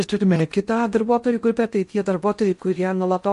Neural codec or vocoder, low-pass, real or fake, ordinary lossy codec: codec, 16 kHz in and 24 kHz out, 0.6 kbps, FocalCodec, streaming, 2048 codes; 10.8 kHz; fake; MP3, 48 kbps